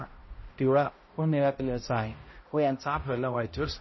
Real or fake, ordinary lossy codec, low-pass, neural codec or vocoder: fake; MP3, 24 kbps; 7.2 kHz; codec, 16 kHz, 0.5 kbps, X-Codec, HuBERT features, trained on balanced general audio